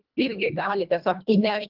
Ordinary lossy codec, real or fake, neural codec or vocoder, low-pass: Opus, 24 kbps; fake; codec, 24 kHz, 1.5 kbps, HILCodec; 5.4 kHz